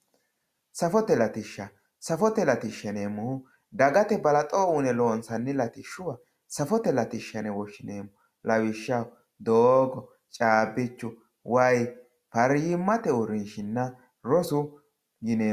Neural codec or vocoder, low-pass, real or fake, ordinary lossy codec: none; 14.4 kHz; real; Opus, 64 kbps